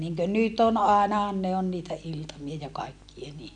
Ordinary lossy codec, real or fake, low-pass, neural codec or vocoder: none; real; 9.9 kHz; none